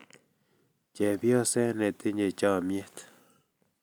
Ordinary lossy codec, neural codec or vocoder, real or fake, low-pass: none; none; real; none